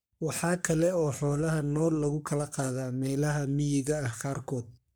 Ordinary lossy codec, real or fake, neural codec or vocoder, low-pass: none; fake; codec, 44.1 kHz, 3.4 kbps, Pupu-Codec; none